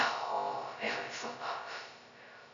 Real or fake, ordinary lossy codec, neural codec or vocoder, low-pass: fake; AAC, 64 kbps; codec, 16 kHz, 0.2 kbps, FocalCodec; 7.2 kHz